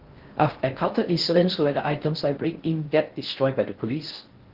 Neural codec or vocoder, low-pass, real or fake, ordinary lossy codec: codec, 16 kHz in and 24 kHz out, 0.6 kbps, FocalCodec, streaming, 4096 codes; 5.4 kHz; fake; Opus, 16 kbps